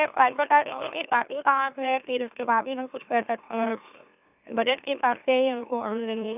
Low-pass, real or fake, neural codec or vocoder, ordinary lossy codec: 3.6 kHz; fake; autoencoder, 44.1 kHz, a latent of 192 numbers a frame, MeloTTS; none